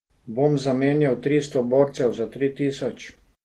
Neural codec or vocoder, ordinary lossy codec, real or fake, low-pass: none; Opus, 16 kbps; real; 14.4 kHz